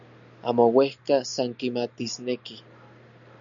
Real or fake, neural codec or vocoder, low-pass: real; none; 7.2 kHz